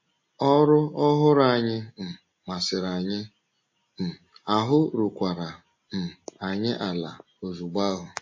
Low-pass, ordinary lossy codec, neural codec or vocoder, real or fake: 7.2 kHz; MP3, 32 kbps; none; real